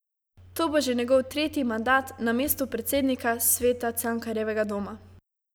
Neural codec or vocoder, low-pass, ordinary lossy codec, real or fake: none; none; none; real